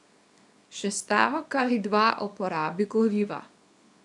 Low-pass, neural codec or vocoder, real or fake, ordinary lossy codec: 10.8 kHz; codec, 24 kHz, 0.9 kbps, WavTokenizer, small release; fake; none